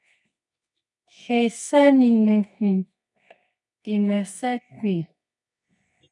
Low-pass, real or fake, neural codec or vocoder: 10.8 kHz; fake; codec, 24 kHz, 0.9 kbps, WavTokenizer, medium music audio release